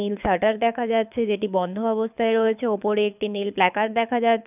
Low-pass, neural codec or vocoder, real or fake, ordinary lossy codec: 3.6 kHz; codec, 24 kHz, 6 kbps, HILCodec; fake; none